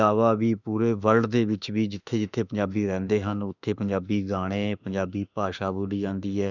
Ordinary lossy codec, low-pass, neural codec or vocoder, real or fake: none; 7.2 kHz; autoencoder, 48 kHz, 32 numbers a frame, DAC-VAE, trained on Japanese speech; fake